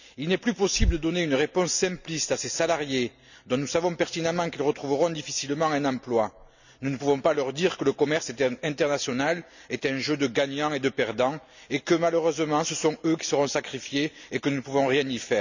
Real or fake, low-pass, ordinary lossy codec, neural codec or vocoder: real; 7.2 kHz; none; none